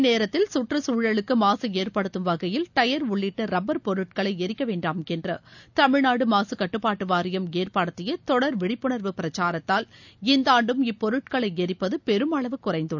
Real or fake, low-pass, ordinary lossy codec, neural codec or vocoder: real; 7.2 kHz; none; none